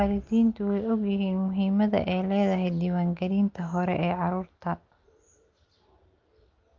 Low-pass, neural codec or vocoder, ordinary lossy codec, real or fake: 7.2 kHz; none; Opus, 32 kbps; real